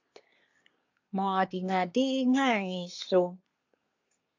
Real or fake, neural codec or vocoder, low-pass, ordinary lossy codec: fake; codec, 24 kHz, 1 kbps, SNAC; 7.2 kHz; AAC, 48 kbps